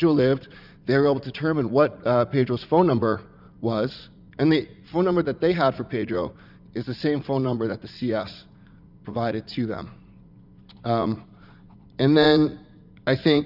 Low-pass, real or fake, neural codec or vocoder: 5.4 kHz; fake; vocoder, 44.1 kHz, 80 mel bands, Vocos